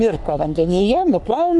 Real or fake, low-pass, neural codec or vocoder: fake; 10.8 kHz; codec, 44.1 kHz, 3.4 kbps, Pupu-Codec